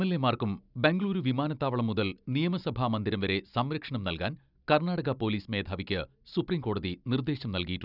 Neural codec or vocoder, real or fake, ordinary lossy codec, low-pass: none; real; none; 5.4 kHz